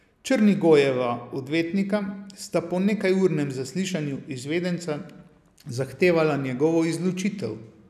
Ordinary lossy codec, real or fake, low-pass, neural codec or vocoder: none; real; 14.4 kHz; none